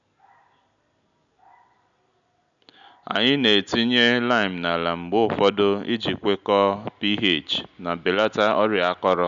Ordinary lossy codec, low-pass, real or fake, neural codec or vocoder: none; 7.2 kHz; real; none